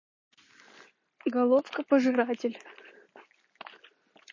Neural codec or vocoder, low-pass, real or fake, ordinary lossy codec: none; 7.2 kHz; real; MP3, 32 kbps